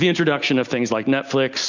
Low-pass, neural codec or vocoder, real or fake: 7.2 kHz; none; real